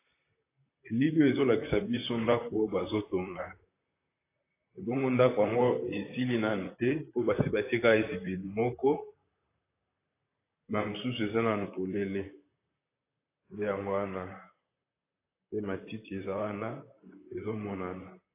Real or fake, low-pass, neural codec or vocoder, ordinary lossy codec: fake; 3.6 kHz; vocoder, 44.1 kHz, 128 mel bands, Pupu-Vocoder; AAC, 24 kbps